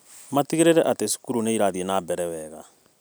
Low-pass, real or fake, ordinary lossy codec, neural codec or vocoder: none; real; none; none